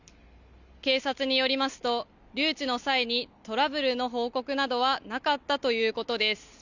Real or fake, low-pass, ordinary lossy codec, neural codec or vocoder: real; 7.2 kHz; none; none